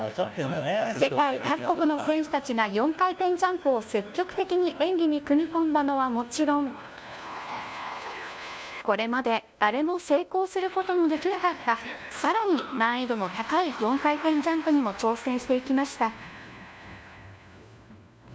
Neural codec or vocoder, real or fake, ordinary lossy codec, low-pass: codec, 16 kHz, 1 kbps, FunCodec, trained on LibriTTS, 50 frames a second; fake; none; none